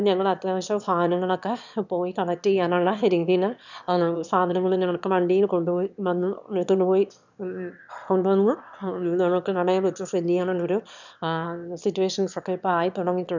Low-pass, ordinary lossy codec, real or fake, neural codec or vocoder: 7.2 kHz; none; fake; autoencoder, 22.05 kHz, a latent of 192 numbers a frame, VITS, trained on one speaker